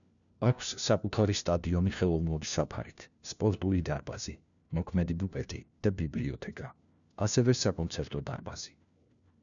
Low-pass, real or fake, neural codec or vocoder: 7.2 kHz; fake; codec, 16 kHz, 1 kbps, FunCodec, trained on LibriTTS, 50 frames a second